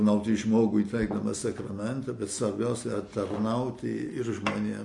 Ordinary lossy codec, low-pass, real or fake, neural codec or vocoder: MP3, 48 kbps; 10.8 kHz; real; none